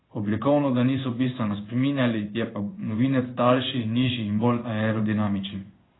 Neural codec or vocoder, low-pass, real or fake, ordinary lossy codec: codec, 16 kHz in and 24 kHz out, 1 kbps, XY-Tokenizer; 7.2 kHz; fake; AAC, 16 kbps